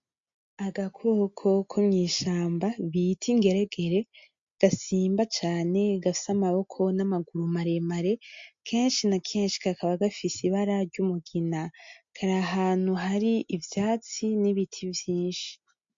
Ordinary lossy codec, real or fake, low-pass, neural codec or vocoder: MP3, 48 kbps; real; 7.2 kHz; none